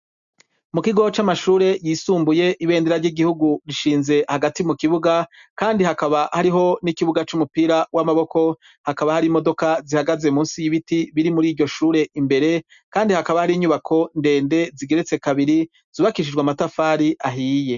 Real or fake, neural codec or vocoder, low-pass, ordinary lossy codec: real; none; 7.2 kHz; MP3, 96 kbps